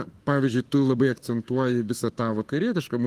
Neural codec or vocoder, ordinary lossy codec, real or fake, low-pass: autoencoder, 48 kHz, 32 numbers a frame, DAC-VAE, trained on Japanese speech; Opus, 24 kbps; fake; 14.4 kHz